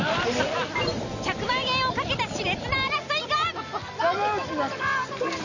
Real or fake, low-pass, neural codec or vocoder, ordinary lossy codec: real; 7.2 kHz; none; none